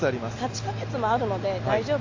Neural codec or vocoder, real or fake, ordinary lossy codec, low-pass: none; real; AAC, 48 kbps; 7.2 kHz